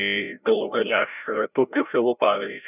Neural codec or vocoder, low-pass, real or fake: codec, 16 kHz, 0.5 kbps, FreqCodec, larger model; 3.6 kHz; fake